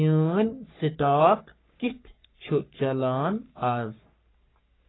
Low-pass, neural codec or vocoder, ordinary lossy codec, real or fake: 7.2 kHz; codec, 44.1 kHz, 3.4 kbps, Pupu-Codec; AAC, 16 kbps; fake